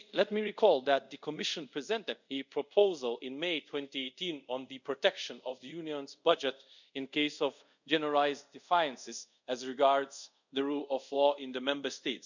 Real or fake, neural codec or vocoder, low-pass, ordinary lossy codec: fake; codec, 24 kHz, 0.5 kbps, DualCodec; 7.2 kHz; none